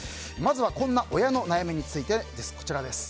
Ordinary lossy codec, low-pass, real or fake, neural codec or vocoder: none; none; real; none